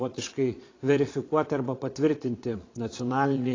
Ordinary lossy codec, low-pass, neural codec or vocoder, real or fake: AAC, 32 kbps; 7.2 kHz; vocoder, 22.05 kHz, 80 mel bands, Vocos; fake